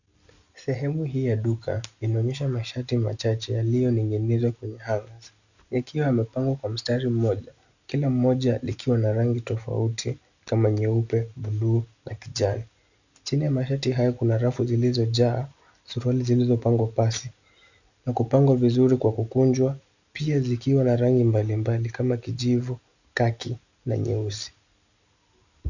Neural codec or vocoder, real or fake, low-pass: none; real; 7.2 kHz